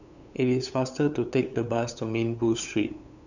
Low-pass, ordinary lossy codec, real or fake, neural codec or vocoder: 7.2 kHz; none; fake; codec, 16 kHz, 8 kbps, FunCodec, trained on LibriTTS, 25 frames a second